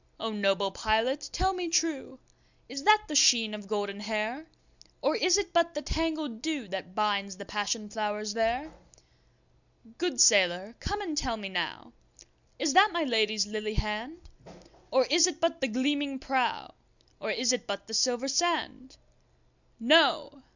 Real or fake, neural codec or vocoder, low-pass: real; none; 7.2 kHz